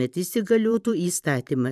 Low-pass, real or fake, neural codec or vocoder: 14.4 kHz; fake; vocoder, 44.1 kHz, 128 mel bands every 512 samples, BigVGAN v2